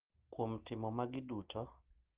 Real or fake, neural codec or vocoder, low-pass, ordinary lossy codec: real; none; 3.6 kHz; Opus, 16 kbps